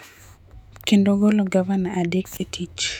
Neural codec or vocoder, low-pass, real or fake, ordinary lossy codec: autoencoder, 48 kHz, 128 numbers a frame, DAC-VAE, trained on Japanese speech; 19.8 kHz; fake; none